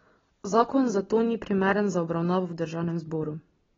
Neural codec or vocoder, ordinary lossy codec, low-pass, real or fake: none; AAC, 24 kbps; 7.2 kHz; real